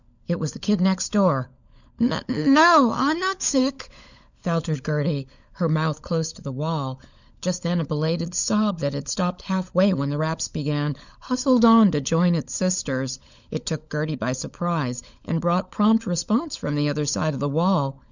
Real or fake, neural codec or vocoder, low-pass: fake; codec, 16 kHz, 16 kbps, FunCodec, trained on LibriTTS, 50 frames a second; 7.2 kHz